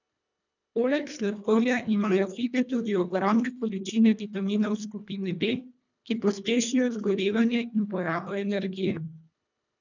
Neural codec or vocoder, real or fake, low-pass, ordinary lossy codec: codec, 24 kHz, 1.5 kbps, HILCodec; fake; 7.2 kHz; none